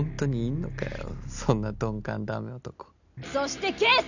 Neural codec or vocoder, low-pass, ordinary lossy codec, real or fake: none; 7.2 kHz; none; real